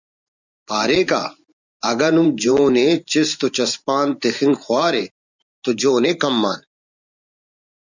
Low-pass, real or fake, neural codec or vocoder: 7.2 kHz; fake; vocoder, 44.1 kHz, 128 mel bands every 512 samples, BigVGAN v2